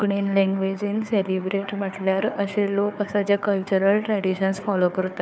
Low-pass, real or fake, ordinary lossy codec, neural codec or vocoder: none; fake; none; codec, 16 kHz, 4 kbps, FunCodec, trained on Chinese and English, 50 frames a second